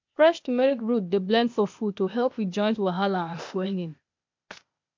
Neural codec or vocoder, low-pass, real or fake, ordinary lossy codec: codec, 16 kHz, 0.8 kbps, ZipCodec; 7.2 kHz; fake; MP3, 48 kbps